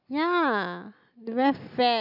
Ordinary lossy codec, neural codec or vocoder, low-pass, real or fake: none; none; 5.4 kHz; real